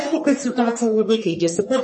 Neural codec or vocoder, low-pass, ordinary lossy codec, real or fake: codec, 44.1 kHz, 1.7 kbps, Pupu-Codec; 9.9 kHz; MP3, 32 kbps; fake